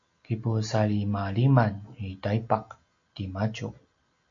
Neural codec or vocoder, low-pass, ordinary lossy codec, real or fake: none; 7.2 kHz; AAC, 48 kbps; real